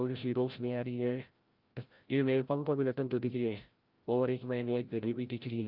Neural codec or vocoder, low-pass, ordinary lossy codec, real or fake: codec, 16 kHz, 0.5 kbps, FreqCodec, larger model; 5.4 kHz; Opus, 24 kbps; fake